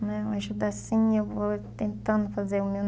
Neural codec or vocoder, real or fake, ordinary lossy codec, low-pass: none; real; none; none